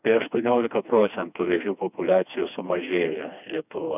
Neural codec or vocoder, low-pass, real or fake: codec, 16 kHz, 2 kbps, FreqCodec, smaller model; 3.6 kHz; fake